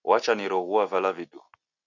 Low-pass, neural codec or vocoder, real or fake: 7.2 kHz; none; real